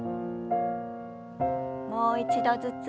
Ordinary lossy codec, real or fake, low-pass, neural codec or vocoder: none; real; none; none